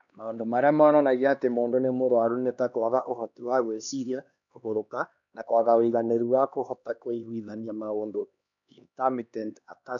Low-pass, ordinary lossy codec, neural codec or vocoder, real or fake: 7.2 kHz; none; codec, 16 kHz, 2 kbps, X-Codec, HuBERT features, trained on LibriSpeech; fake